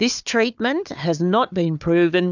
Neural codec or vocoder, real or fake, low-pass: codec, 16 kHz, 4 kbps, FunCodec, trained on Chinese and English, 50 frames a second; fake; 7.2 kHz